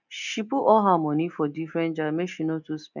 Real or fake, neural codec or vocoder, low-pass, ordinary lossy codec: real; none; 7.2 kHz; none